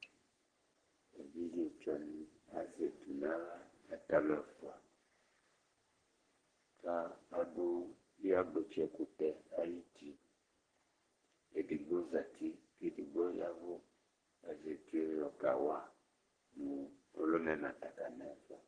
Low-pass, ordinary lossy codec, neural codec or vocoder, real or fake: 9.9 kHz; Opus, 16 kbps; codec, 44.1 kHz, 3.4 kbps, Pupu-Codec; fake